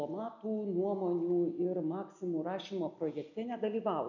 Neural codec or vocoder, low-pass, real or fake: none; 7.2 kHz; real